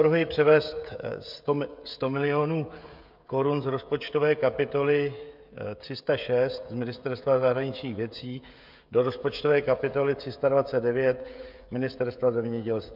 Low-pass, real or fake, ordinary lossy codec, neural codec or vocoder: 5.4 kHz; fake; MP3, 48 kbps; codec, 16 kHz, 16 kbps, FreqCodec, smaller model